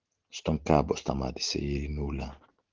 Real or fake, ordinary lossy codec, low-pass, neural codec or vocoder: real; Opus, 16 kbps; 7.2 kHz; none